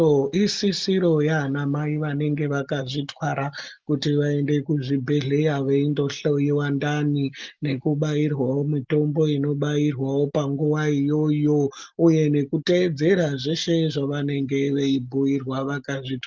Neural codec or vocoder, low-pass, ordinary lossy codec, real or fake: none; 7.2 kHz; Opus, 32 kbps; real